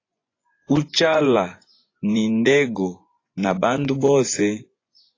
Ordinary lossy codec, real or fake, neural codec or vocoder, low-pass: AAC, 32 kbps; fake; vocoder, 24 kHz, 100 mel bands, Vocos; 7.2 kHz